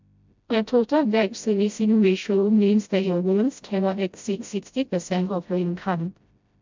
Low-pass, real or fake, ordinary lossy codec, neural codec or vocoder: 7.2 kHz; fake; MP3, 64 kbps; codec, 16 kHz, 0.5 kbps, FreqCodec, smaller model